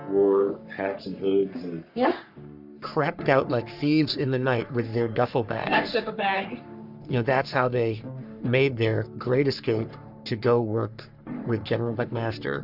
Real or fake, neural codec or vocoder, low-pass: fake; codec, 44.1 kHz, 3.4 kbps, Pupu-Codec; 5.4 kHz